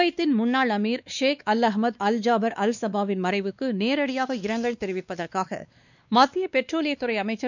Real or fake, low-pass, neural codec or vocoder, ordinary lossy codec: fake; 7.2 kHz; codec, 16 kHz, 2 kbps, X-Codec, WavLM features, trained on Multilingual LibriSpeech; none